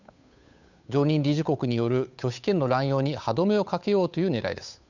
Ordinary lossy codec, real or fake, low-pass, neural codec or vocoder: none; fake; 7.2 kHz; codec, 16 kHz, 8 kbps, FunCodec, trained on Chinese and English, 25 frames a second